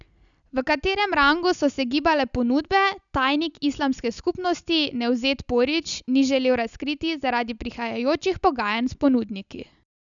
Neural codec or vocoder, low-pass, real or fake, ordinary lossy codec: none; 7.2 kHz; real; none